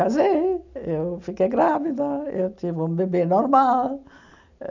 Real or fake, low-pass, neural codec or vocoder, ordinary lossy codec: real; 7.2 kHz; none; none